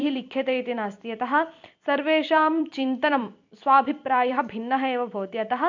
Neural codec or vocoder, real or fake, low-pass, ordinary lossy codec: vocoder, 44.1 kHz, 128 mel bands every 256 samples, BigVGAN v2; fake; 7.2 kHz; MP3, 48 kbps